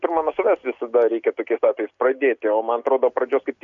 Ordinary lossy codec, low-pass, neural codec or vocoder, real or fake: MP3, 96 kbps; 7.2 kHz; none; real